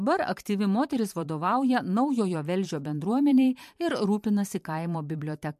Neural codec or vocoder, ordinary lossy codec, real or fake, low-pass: vocoder, 44.1 kHz, 128 mel bands every 512 samples, BigVGAN v2; MP3, 64 kbps; fake; 14.4 kHz